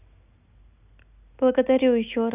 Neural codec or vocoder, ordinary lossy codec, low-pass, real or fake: none; none; 3.6 kHz; real